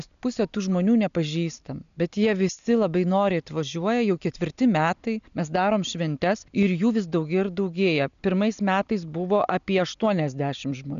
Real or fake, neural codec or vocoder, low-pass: real; none; 7.2 kHz